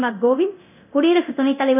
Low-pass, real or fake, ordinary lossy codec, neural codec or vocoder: 3.6 kHz; fake; none; codec, 24 kHz, 0.9 kbps, DualCodec